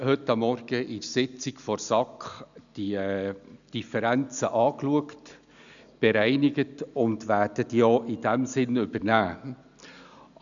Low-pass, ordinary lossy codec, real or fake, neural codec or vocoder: 7.2 kHz; none; real; none